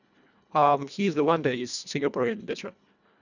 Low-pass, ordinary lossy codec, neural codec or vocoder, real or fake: 7.2 kHz; none; codec, 24 kHz, 1.5 kbps, HILCodec; fake